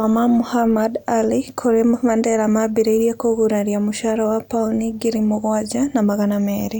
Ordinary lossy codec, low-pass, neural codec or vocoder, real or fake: none; 19.8 kHz; none; real